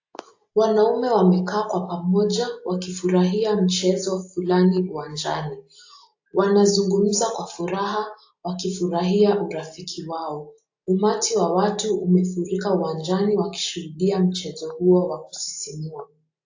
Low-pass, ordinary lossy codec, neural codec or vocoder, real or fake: 7.2 kHz; AAC, 48 kbps; none; real